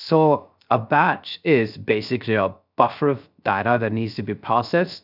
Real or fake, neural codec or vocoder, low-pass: fake; codec, 16 kHz, 0.3 kbps, FocalCodec; 5.4 kHz